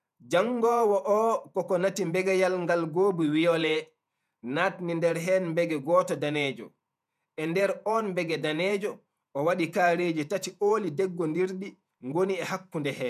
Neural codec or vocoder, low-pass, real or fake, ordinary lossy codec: vocoder, 48 kHz, 128 mel bands, Vocos; 14.4 kHz; fake; none